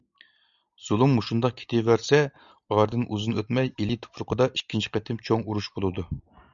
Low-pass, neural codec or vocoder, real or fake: 7.2 kHz; none; real